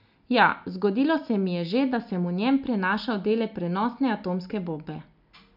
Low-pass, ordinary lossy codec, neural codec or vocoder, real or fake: 5.4 kHz; none; none; real